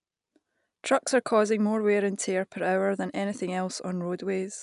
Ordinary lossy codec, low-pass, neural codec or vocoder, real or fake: none; 10.8 kHz; none; real